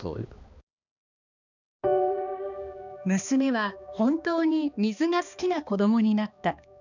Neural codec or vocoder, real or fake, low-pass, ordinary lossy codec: codec, 16 kHz, 2 kbps, X-Codec, HuBERT features, trained on balanced general audio; fake; 7.2 kHz; none